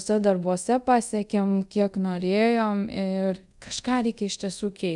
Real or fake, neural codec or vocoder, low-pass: fake; codec, 24 kHz, 0.5 kbps, DualCodec; 10.8 kHz